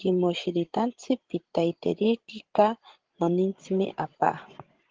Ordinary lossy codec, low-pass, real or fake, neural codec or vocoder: Opus, 16 kbps; 7.2 kHz; fake; codec, 16 kHz, 16 kbps, FreqCodec, larger model